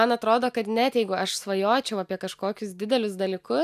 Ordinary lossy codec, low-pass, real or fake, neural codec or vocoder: AAC, 96 kbps; 14.4 kHz; real; none